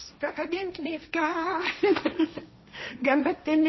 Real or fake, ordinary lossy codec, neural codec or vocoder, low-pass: fake; MP3, 24 kbps; codec, 16 kHz, 1.1 kbps, Voila-Tokenizer; 7.2 kHz